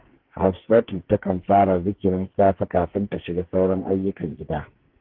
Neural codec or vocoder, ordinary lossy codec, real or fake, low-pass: codec, 44.1 kHz, 2.6 kbps, SNAC; Opus, 16 kbps; fake; 5.4 kHz